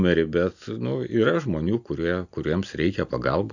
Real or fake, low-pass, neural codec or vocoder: real; 7.2 kHz; none